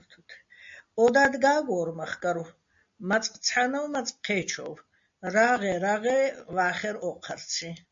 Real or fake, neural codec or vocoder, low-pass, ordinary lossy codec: real; none; 7.2 kHz; MP3, 48 kbps